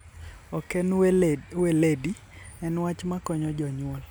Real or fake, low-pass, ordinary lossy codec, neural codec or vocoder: real; none; none; none